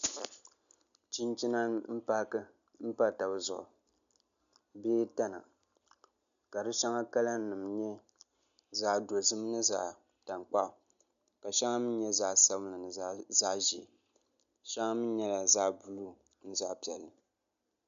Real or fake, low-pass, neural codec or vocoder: real; 7.2 kHz; none